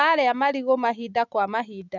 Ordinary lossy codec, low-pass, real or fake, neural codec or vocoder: none; 7.2 kHz; real; none